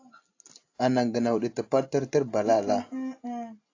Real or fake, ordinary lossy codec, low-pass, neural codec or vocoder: real; AAC, 48 kbps; 7.2 kHz; none